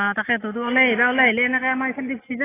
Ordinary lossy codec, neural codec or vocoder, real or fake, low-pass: AAC, 16 kbps; none; real; 3.6 kHz